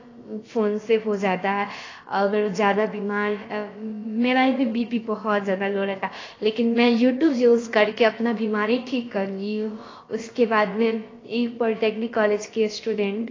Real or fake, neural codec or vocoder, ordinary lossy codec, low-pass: fake; codec, 16 kHz, about 1 kbps, DyCAST, with the encoder's durations; AAC, 32 kbps; 7.2 kHz